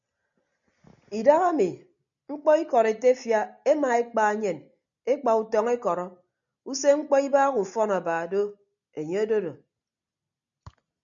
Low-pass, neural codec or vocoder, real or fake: 7.2 kHz; none; real